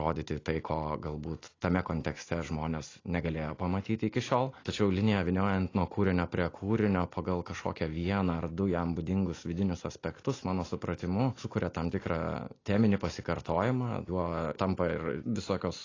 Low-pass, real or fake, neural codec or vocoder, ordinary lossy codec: 7.2 kHz; real; none; AAC, 32 kbps